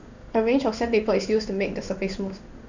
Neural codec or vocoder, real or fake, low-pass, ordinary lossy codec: codec, 16 kHz in and 24 kHz out, 1 kbps, XY-Tokenizer; fake; 7.2 kHz; Opus, 64 kbps